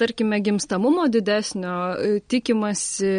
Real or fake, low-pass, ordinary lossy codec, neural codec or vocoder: real; 9.9 kHz; MP3, 48 kbps; none